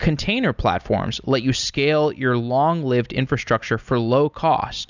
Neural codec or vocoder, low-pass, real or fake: none; 7.2 kHz; real